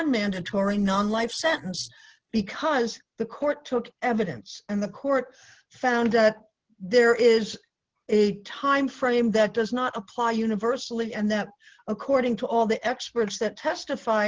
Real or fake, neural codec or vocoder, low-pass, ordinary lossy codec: real; none; 7.2 kHz; Opus, 16 kbps